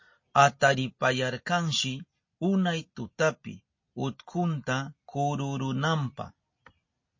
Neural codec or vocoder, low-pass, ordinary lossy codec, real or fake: none; 7.2 kHz; MP3, 32 kbps; real